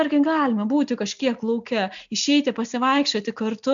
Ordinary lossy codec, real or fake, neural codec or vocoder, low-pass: MP3, 96 kbps; real; none; 7.2 kHz